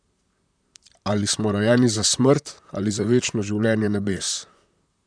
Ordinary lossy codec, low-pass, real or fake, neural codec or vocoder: none; 9.9 kHz; fake; vocoder, 44.1 kHz, 128 mel bands, Pupu-Vocoder